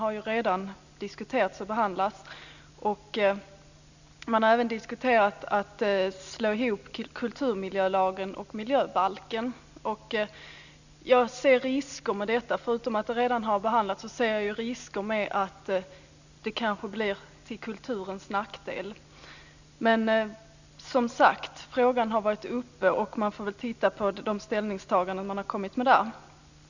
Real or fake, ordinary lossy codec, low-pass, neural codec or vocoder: real; none; 7.2 kHz; none